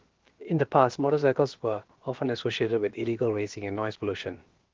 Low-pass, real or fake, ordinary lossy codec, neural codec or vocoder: 7.2 kHz; fake; Opus, 16 kbps; codec, 16 kHz, about 1 kbps, DyCAST, with the encoder's durations